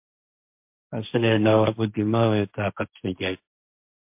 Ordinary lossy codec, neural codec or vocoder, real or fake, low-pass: MP3, 32 kbps; codec, 16 kHz, 1.1 kbps, Voila-Tokenizer; fake; 3.6 kHz